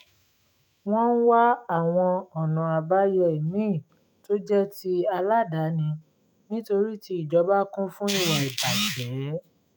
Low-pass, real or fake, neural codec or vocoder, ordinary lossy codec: none; fake; autoencoder, 48 kHz, 128 numbers a frame, DAC-VAE, trained on Japanese speech; none